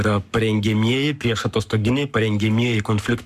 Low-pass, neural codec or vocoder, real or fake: 14.4 kHz; codec, 44.1 kHz, 7.8 kbps, Pupu-Codec; fake